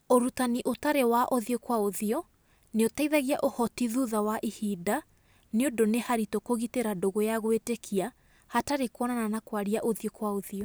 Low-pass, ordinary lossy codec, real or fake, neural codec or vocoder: none; none; real; none